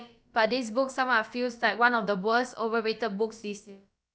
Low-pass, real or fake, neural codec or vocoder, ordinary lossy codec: none; fake; codec, 16 kHz, about 1 kbps, DyCAST, with the encoder's durations; none